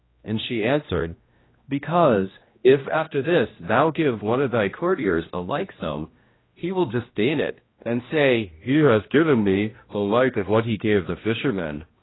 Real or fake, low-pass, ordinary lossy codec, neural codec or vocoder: fake; 7.2 kHz; AAC, 16 kbps; codec, 16 kHz, 1 kbps, X-Codec, HuBERT features, trained on general audio